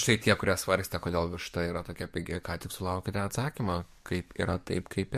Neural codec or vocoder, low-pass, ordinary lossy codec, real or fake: codec, 44.1 kHz, 7.8 kbps, DAC; 14.4 kHz; MP3, 64 kbps; fake